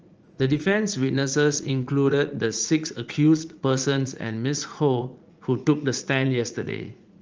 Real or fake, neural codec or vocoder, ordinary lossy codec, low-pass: fake; vocoder, 44.1 kHz, 80 mel bands, Vocos; Opus, 24 kbps; 7.2 kHz